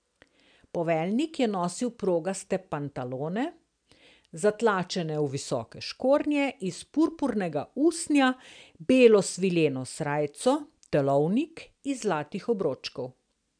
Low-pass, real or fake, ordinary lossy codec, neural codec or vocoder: 9.9 kHz; real; MP3, 96 kbps; none